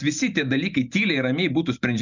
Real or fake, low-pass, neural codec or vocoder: real; 7.2 kHz; none